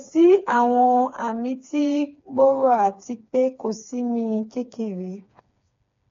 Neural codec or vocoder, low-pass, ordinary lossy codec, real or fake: codec, 16 kHz, 4 kbps, FreqCodec, smaller model; 7.2 kHz; MP3, 48 kbps; fake